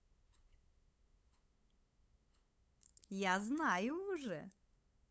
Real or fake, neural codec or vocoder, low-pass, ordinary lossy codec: fake; codec, 16 kHz, 8 kbps, FunCodec, trained on LibriTTS, 25 frames a second; none; none